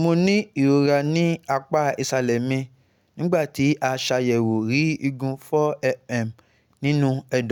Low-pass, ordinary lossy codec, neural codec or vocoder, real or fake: 19.8 kHz; none; none; real